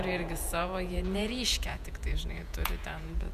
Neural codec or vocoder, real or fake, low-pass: vocoder, 48 kHz, 128 mel bands, Vocos; fake; 14.4 kHz